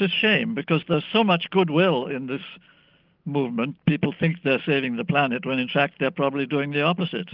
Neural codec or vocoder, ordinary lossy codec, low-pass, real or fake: none; Opus, 32 kbps; 5.4 kHz; real